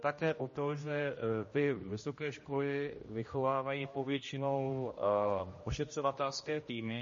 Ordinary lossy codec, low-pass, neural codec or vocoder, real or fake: MP3, 32 kbps; 7.2 kHz; codec, 16 kHz, 1 kbps, X-Codec, HuBERT features, trained on general audio; fake